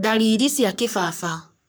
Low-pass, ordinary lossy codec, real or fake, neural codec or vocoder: none; none; fake; codec, 44.1 kHz, 3.4 kbps, Pupu-Codec